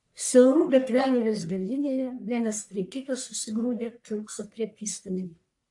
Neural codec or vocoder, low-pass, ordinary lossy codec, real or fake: codec, 44.1 kHz, 1.7 kbps, Pupu-Codec; 10.8 kHz; AAC, 64 kbps; fake